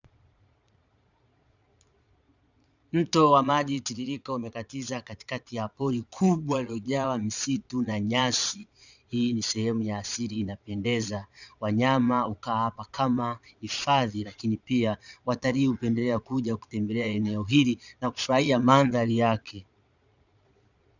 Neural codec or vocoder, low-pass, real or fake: vocoder, 22.05 kHz, 80 mel bands, Vocos; 7.2 kHz; fake